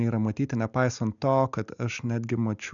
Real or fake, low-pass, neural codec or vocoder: real; 7.2 kHz; none